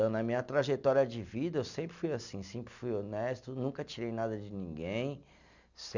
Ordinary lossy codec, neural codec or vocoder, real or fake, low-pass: none; none; real; 7.2 kHz